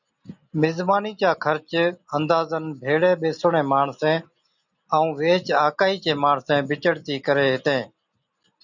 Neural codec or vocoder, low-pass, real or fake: none; 7.2 kHz; real